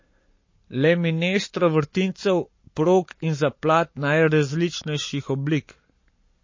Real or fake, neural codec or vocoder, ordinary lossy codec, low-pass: fake; codec, 44.1 kHz, 7.8 kbps, Pupu-Codec; MP3, 32 kbps; 7.2 kHz